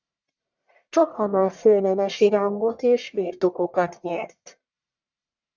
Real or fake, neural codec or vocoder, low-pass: fake; codec, 44.1 kHz, 1.7 kbps, Pupu-Codec; 7.2 kHz